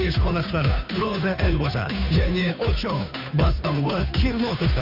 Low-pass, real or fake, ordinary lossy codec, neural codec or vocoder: 5.4 kHz; fake; none; codec, 16 kHz, 2 kbps, FunCodec, trained on Chinese and English, 25 frames a second